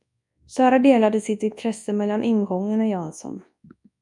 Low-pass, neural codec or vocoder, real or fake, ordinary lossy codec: 10.8 kHz; codec, 24 kHz, 0.9 kbps, WavTokenizer, large speech release; fake; MP3, 96 kbps